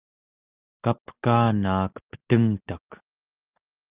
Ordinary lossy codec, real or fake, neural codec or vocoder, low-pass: Opus, 32 kbps; real; none; 3.6 kHz